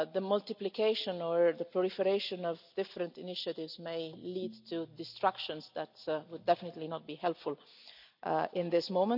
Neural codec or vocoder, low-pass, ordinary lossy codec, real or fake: none; 5.4 kHz; none; real